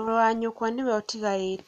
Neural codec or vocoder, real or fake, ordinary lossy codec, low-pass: none; real; none; 14.4 kHz